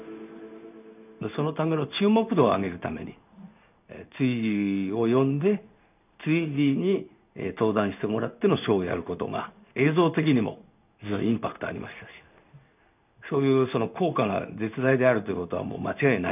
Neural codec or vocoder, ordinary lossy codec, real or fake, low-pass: codec, 16 kHz in and 24 kHz out, 1 kbps, XY-Tokenizer; none; fake; 3.6 kHz